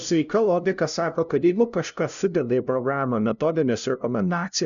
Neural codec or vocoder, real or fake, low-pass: codec, 16 kHz, 0.5 kbps, FunCodec, trained on LibriTTS, 25 frames a second; fake; 7.2 kHz